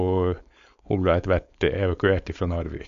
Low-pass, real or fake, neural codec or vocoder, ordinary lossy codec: 7.2 kHz; fake; codec, 16 kHz, 4.8 kbps, FACodec; MP3, 64 kbps